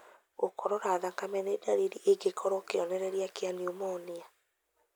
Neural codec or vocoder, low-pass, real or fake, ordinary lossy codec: none; none; real; none